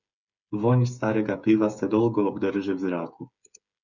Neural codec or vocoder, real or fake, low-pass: codec, 16 kHz, 8 kbps, FreqCodec, smaller model; fake; 7.2 kHz